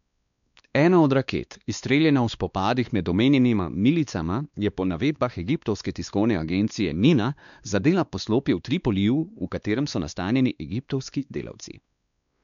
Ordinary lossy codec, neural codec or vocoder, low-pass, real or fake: none; codec, 16 kHz, 2 kbps, X-Codec, WavLM features, trained on Multilingual LibriSpeech; 7.2 kHz; fake